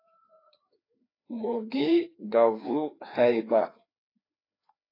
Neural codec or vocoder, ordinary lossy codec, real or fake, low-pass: codec, 16 kHz, 2 kbps, FreqCodec, larger model; AAC, 24 kbps; fake; 5.4 kHz